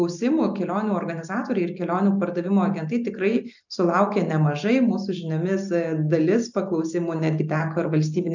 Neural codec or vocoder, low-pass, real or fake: none; 7.2 kHz; real